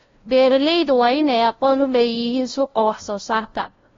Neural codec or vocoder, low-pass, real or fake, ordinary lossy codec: codec, 16 kHz, 0.5 kbps, FunCodec, trained on LibriTTS, 25 frames a second; 7.2 kHz; fake; AAC, 32 kbps